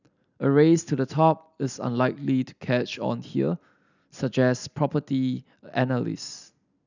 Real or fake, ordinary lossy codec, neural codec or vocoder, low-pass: real; none; none; 7.2 kHz